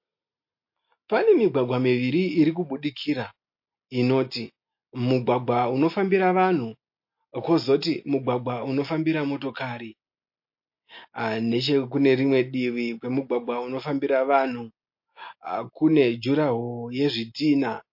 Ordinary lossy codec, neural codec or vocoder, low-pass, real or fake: MP3, 32 kbps; none; 5.4 kHz; real